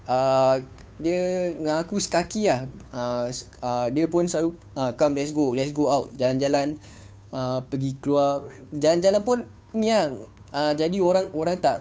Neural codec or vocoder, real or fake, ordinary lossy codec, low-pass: codec, 16 kHz, 2 kbps, FunCodec, trained on Chinese and English, 25 frames a second; fake; none; none